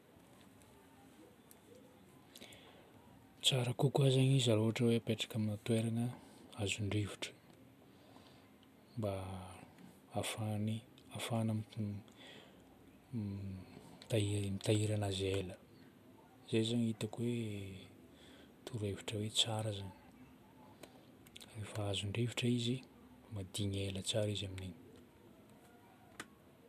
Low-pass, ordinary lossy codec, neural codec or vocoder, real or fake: 14.4 kHz; none; none; real